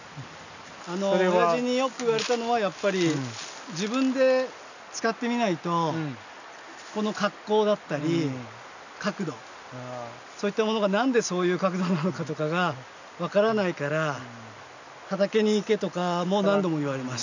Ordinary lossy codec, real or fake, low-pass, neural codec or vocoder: none; real; 7.2 kHz; none